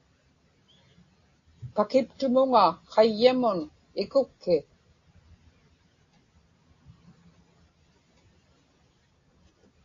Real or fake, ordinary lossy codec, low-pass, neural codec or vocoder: real; AAC, 32 kbps; 7.2 kHz; none